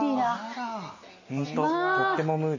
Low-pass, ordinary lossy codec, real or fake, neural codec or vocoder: 7.2 kHz; MP3, 32 kbps; real; none